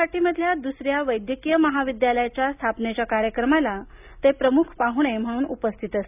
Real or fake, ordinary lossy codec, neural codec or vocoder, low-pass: real; none; none; 3.6 kHz